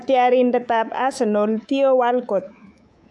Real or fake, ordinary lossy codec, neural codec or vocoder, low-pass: fake; none; codec, 24 kHz, 3.1 kbps, DualCodec; none